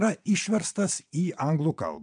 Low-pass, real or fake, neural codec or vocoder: 9.9 kHz; fake; vocoder, 22.05 kHz, 80 mel bands, WaveNeXt